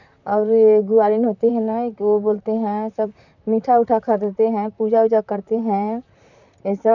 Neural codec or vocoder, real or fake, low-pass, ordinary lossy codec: vocoder, 44.1 kHz, 128 mel bands, Pupu-Vocoder; fake; 7.2 kHz; none